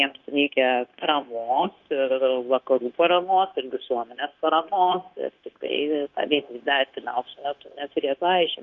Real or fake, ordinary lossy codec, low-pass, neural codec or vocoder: fake; Opus, 24 kbps; 7.2 kHz; codec, 16 kHz, 0.9 kbps, LongCat-Audio-Codec